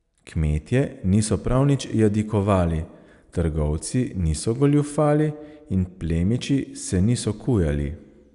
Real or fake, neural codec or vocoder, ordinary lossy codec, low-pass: real; none; none; 10.8 kHz